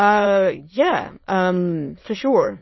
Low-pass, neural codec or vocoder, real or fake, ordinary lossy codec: 7.2 kHz; autoencoder, 22.05 kHz, a latent of 192 numbers a frame, VITS, trained on many speakers; fake; MP3, 24 kbps